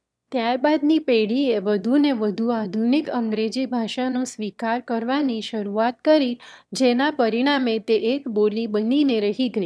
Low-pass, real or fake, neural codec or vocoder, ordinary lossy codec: none; fake; autoencoder, 22.05 kHz, a latent of 192 numbers a frame, VITS, trained on one speaker; none